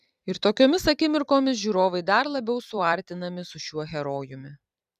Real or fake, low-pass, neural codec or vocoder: fake; 14.4 kHz; vocoder, 44.1 kHz, 128 mel bands every 256 samples, BigVGAN v2